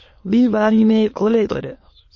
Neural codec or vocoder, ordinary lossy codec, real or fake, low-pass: autoencoder, 22.05 kHz, a latent of 192 numbers a frame, VITS, trained on many speakers; MP3, 32 kbps; fake; 7.2 kHz